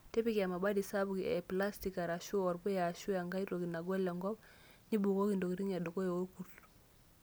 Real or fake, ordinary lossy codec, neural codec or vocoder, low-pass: real; none; none; none